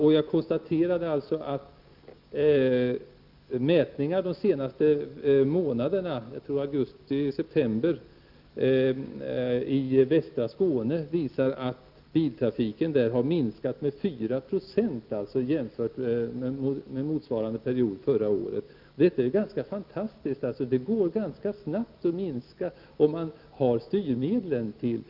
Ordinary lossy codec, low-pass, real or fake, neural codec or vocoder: Opus, 32 kbps; 5.4 kHz; real; none